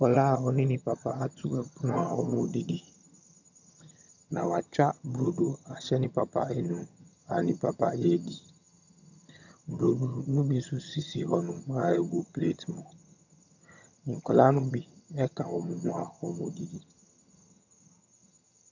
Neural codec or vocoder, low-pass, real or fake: vocoder, 22.05 kHz, 80 mel bands, HiFi-GAN; 7.2 kHz; fake